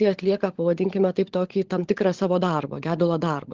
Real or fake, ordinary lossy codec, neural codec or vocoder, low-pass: fake; Opus, 16 kbps; codec, 16 kHz, 16 kbps, FunCodec, trained on LibriTTS, 50 frames a second; 7.2 kHz